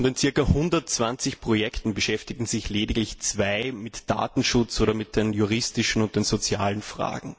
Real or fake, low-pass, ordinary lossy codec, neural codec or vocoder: real; none; none; none